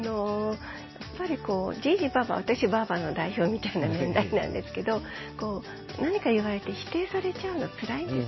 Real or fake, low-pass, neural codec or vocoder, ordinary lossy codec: real; 7.2 kHz; none; MP3, 24 kbps